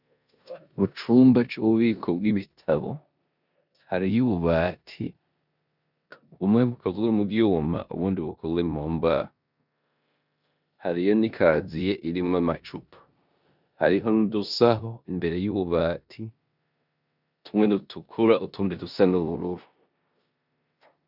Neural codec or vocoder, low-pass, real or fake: codec, 16 kHz in and 24 kHz out, 0.9 kbps, LongCat-Audio-Codec, four codebook decoder; 5.4 kHz; fake